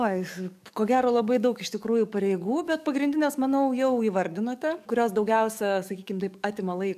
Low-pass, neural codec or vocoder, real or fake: 14.4 kHz; codec, 44.1 kHz, 7.8 kbps, DAC; fake